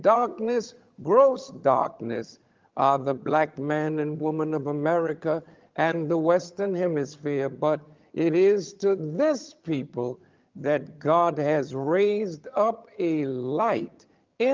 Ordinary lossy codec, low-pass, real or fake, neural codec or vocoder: Opus, 32 kbps; 7.2 kHz; fake; vocoder, 22.05 kHz, 80 mel bands, HiFi-GAN